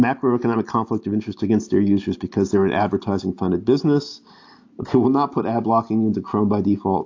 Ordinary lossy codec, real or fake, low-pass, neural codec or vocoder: AAC, 48 kbps; real; 7.2 kHz; none